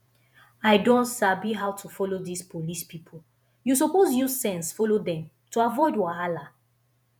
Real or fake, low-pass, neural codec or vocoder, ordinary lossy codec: fake; none; vocoder, 48 kHz, 128 mel bands, Vocos; none